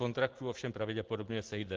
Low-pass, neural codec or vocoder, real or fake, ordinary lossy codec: 7.2 kHz; none; real; Opus, 16 kbps